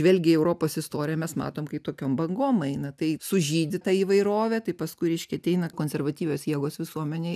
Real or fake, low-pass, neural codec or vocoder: real; 14.4 kHz; none